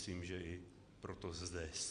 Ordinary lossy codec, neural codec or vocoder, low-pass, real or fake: Opus, 64 kbps; none; 9.9 kHz; real